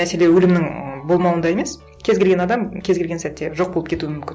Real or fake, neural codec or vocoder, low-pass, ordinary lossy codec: real; none; none; none